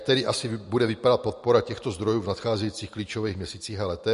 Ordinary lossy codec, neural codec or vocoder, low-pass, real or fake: MP3, 48 kbps; none; 14.4 kHz; real